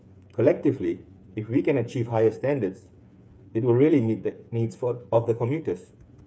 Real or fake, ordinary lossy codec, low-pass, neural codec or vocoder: fake; none; none; codec, 16 kHz, 8 kbps, FreqCodec, smaller model